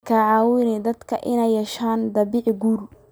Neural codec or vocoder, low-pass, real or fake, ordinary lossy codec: none; none; real; none